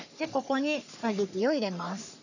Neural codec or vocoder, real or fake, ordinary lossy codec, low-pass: codec, 44.1 kHz, 3.4 kbps, Pupu-Codec; fake; none; 7.2 kHz